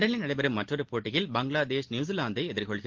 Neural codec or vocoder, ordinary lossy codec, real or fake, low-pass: none; Opus, 16 kbps; real; 7.2 kHz